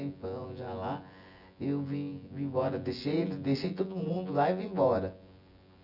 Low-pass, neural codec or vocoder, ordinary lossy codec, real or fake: 5.4 kHz; vocoder, 24 kHz, 100 mel bands, Vocos; none; fake